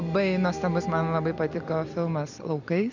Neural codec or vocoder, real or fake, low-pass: none; real; 7.2 kHz